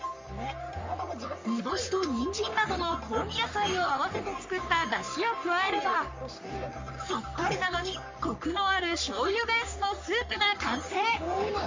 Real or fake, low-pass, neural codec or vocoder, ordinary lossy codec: fake; 7.2 kHz; codec, 44.1 kHz, 3.4 kbps, Pupu-Codec; MP3, 48 kbps